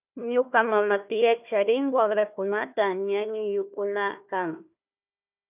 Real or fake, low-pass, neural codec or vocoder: fake; 3.6 kHz; codec, 16 kHz, 1 kbps, FunCodec, trained on Chinese and English, 50 frames a second